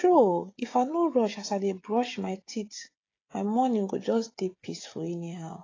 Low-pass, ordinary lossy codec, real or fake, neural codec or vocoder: 7.2 kHz; AAC, 32 kbps; fake; codec, 16 kHz, 16 kbps, FreqCodec, smaller model